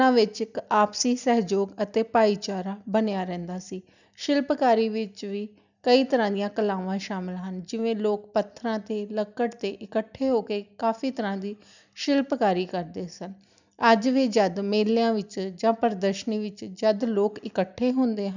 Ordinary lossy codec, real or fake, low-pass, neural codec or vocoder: none; real; 7.2 kHz; none